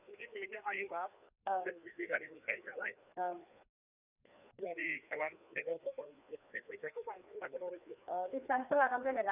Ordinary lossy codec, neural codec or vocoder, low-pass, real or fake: none; codec, 16 kHz, 2 kbps, FreqCodec, larger model; 3.6 kHz; fake